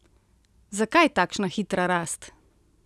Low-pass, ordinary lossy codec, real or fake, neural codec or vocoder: none; none; real; none